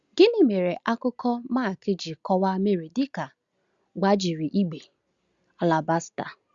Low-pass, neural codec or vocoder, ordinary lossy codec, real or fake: 7.2 kHz; none; Opus, 64 kbps; real